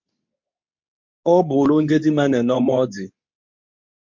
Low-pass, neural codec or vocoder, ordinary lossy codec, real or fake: 7.2 kHz; codec, 24 kHz, 0.9 kbps, WavTokenizer, medium speech release version 2; MP3, 48 kbps; fake